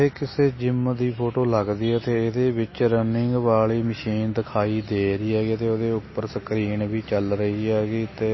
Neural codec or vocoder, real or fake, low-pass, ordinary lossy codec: none; real; 7.2 kHz; MP3, 24 kbps